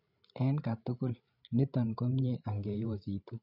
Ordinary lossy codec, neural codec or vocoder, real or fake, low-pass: none; codec, 16 kHz, 16 kbps, FreqCodec, larger model; fake; 5.4 kHz